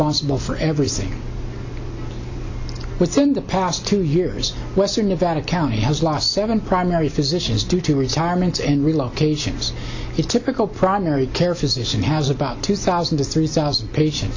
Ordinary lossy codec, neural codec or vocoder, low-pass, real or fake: AAC, 48 kbps; none; 7.2 kHz; real